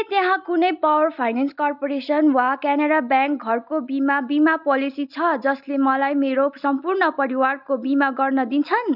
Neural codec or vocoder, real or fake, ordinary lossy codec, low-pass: none; real; none; 5.4 kHz